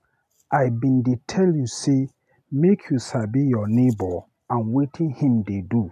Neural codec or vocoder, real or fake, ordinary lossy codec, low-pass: none; real; none; 14.4 kHz